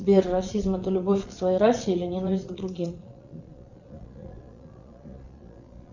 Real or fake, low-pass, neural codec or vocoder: fake; 7.2 kHz; vocoder, 22.05 kHz, 80 mel bands, Vocos